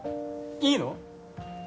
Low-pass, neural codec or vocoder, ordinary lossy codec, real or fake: none; none; none; real